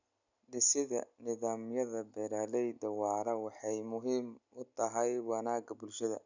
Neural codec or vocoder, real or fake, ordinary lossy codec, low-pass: none; real; none; 7.2 kHz